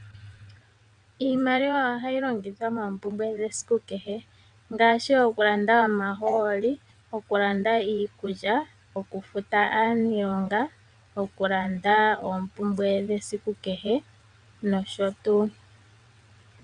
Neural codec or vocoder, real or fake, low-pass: vocoder, 22.05 kHz, 80 mel bands, WaveNeXt; fake; 9.9 kHz